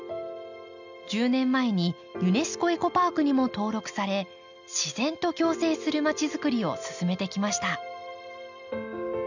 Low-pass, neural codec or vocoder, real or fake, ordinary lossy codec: 7.2 kHz; none; real; none